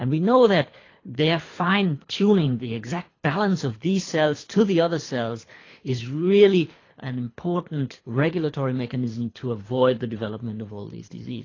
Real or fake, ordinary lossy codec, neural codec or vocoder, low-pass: fake; AAC, 32 kbps; codec, 24 kHz, 3 kbps, HILCodec; 7.2 kHz